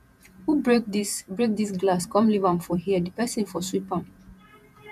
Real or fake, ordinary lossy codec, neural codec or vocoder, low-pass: fake; MP3, 96 kbps; vocoder, 48 kHz, 128 mel bands, Vocos; 14.4 kHz